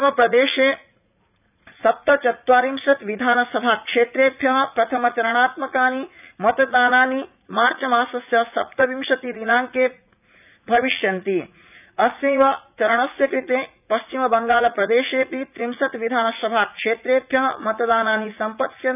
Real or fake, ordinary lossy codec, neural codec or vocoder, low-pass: fake; none; vocoder, 44.1 kHz, 80 mel bands, Vocos; 3.6 kHz